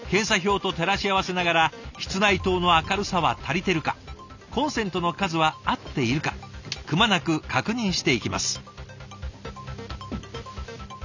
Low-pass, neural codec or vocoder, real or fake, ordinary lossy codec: 7.2 kHz; none; real; none